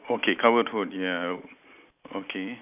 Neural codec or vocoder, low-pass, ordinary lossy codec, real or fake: none; 3.6 kHz; none; real